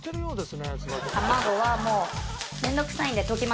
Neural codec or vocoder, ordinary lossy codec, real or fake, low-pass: none; none; real; none